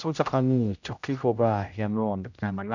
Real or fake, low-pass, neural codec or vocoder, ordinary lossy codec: fake; 7.2 kHz; codec, 16 kHz, 0.5 kbps, X-Codec, HuBERT features, trained on general audio; none